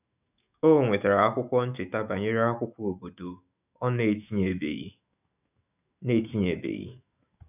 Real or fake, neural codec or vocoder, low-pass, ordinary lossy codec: fake; autoencoder, 48 kHz, 128 numbers a frame, DAC-VAE, trained on Japanese speech; 3.6 kHz; none